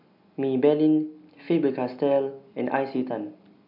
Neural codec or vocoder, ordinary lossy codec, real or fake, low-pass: none; none; real; 5.4 kHz